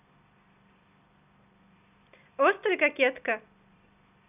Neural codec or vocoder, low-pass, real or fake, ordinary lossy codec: none; 3.6 kHz; real; none